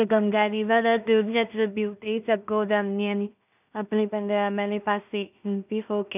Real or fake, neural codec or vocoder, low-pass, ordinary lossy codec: fake; codec, 16 kHz in and 24 kHz out, 0.4 kbps, LongCat-Audio-Codec, two codebook decoder; 3.6 kHz; none